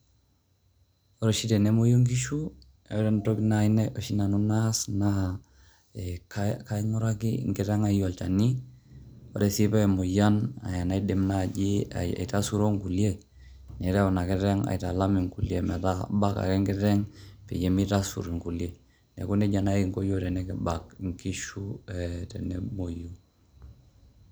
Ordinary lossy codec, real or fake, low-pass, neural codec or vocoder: none; real; none; none